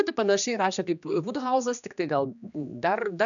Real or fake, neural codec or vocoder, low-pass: fake; codec, 16 kHz, 2 kbps, X-Codec, HuBERT features, trained on general audio; 7.2 kHz